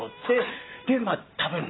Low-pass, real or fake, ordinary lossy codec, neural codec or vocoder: 7.2 kHz; real; AAC, 16 kbps; none